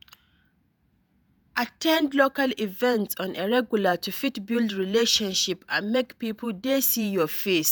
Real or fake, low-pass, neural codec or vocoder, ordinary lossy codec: fake; none; vocoder, 48 kHz, 128 mel bands, Vocos; none